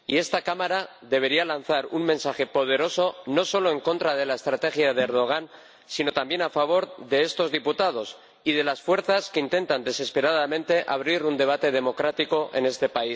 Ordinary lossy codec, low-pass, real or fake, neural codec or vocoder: none; none; real; none